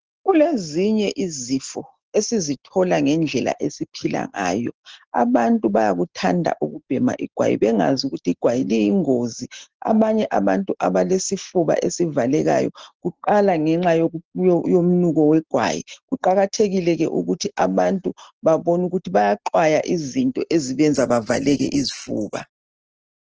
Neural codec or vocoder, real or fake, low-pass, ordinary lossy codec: none; real; 7.2 kHz; Opus, 16 kbps